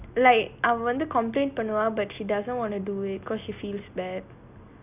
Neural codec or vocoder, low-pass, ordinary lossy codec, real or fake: none; 3.6 kHz; none; real